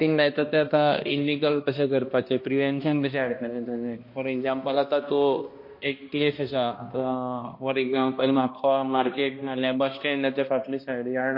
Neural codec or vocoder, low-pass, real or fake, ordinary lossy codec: codec, 16 kHz, 1 kbps, X-Codec, HuBERT features, trained on balanced general audio; 5.4 kHz; fake; MP3, 32 kbps